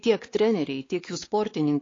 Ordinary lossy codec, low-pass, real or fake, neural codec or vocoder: AAC, 32 kbps; 7.2 kHz; fake; codec, 16 kHz, 4 kbps, X-Codec, WavLM features, trained on Multilingual LibriSpeech